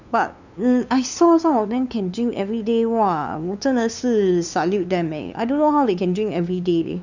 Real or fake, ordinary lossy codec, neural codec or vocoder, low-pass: fake; none; codec, 16 kHz, 2 kbps, FunCodec, trained on LibriTTS, 25 frames a second; 7.2 kHz